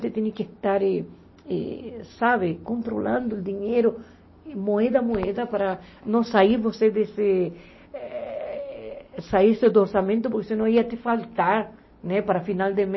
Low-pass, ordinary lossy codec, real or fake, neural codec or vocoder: 7.2 kHz; MP3, 24 kbps; real; none